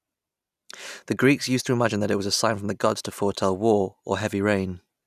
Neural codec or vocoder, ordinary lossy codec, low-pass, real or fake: none; none; 14.4 kHz; real